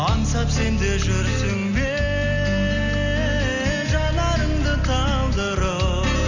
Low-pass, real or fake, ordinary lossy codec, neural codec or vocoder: 7.2 kHz; real; none; none